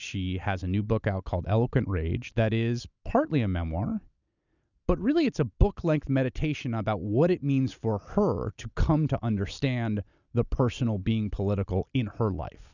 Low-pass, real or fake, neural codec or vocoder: 7.2 kHz; real; none